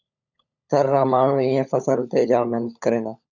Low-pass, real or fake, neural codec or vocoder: 7.2 kHz; fake; codec, 16 kHz, 16 kbps, FunCodec, trained on LibriTTS, 50 frames a second